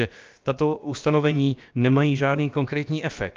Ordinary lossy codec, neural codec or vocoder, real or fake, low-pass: Opus, 24 kbps; codec, 16 kHz, about 1 kbps, DyCAST, with the encoder's durations; fake; 7.2 kHz